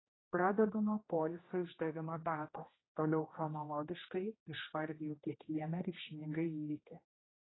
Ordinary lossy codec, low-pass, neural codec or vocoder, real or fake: AAC, 16 kbps; 7.2 kHz; codec, 16 kHz, 1 kbps, X-Codec, HuBERT features, trained on general audio; fake